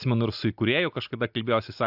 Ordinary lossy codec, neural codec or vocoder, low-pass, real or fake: AAC, 48 kbps; none; 5.4 kHz; real